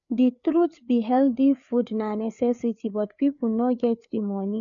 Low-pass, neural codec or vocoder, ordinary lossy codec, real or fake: 7.2 kHz; codec, 16 kHz, 4 kbps, FreqCodec, larger model; none; fake